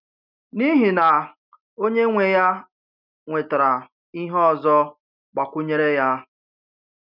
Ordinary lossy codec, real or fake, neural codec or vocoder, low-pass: none; real; none; 5.4 kHz